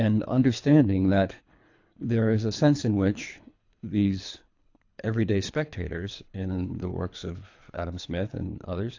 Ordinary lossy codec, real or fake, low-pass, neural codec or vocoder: AAC, 48 kbps; fake; 7.2 kHz; codec, 24 kHz, 3 kbps, HILCodec